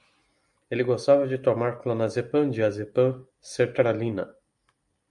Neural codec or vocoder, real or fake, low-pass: none; real; 10.8 kHz